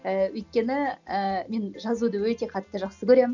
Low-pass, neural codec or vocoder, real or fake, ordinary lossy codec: 7.2 kHz; none; real; none